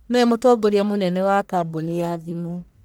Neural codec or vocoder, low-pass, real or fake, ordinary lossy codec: codec, 44.1 kHz, 1.7 kbps, Pupu-Codec; none; fake; none